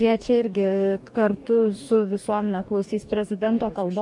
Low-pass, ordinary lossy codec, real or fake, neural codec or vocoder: 10.8 kHz; MP3, 64 kbps; fake; codec, 44.1 kHz, 2.6 kbps, DAC